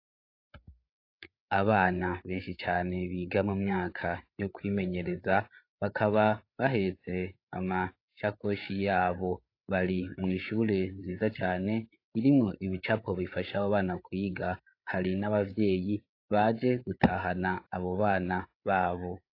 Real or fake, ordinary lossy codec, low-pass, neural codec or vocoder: fake; AAC, 32 kbps; 5.4 kHz; codec, 16 kHz, 8 kbps, FreqCodec, larger model